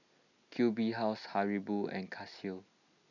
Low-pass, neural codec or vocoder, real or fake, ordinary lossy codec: 7.2 kHz; none; real; none